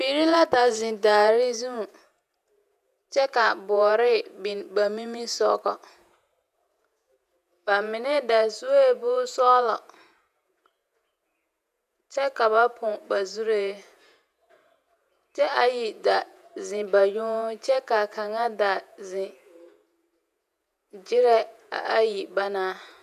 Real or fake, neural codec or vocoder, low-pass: fake; vocoder, 48 kHz, 128 mel bands, Vocos; 14.4 kHz